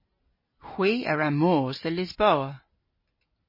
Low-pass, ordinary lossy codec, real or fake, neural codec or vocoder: 5.4 kHz; MP3, 24 kbps; real; none